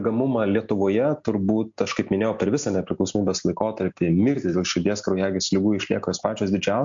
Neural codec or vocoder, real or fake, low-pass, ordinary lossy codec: none; real; 7.2 kHz; MP3, 48 kbps